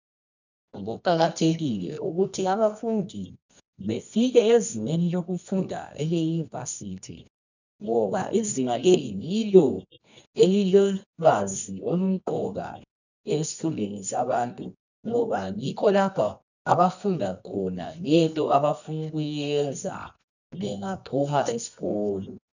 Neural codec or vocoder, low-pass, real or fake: codec, 24 kHz, 0.9 kbps, WavTokenizer, medium music audio release; 7.2 kHz; fake